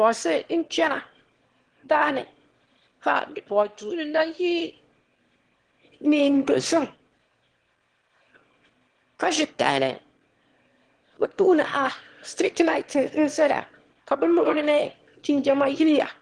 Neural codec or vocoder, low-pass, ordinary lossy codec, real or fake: autoencoder, 22.05 kHz, a latent of 192 numbers a frame, VITS, trained on one speaker; 9.9 kHz; Opus, 16 kbps; fake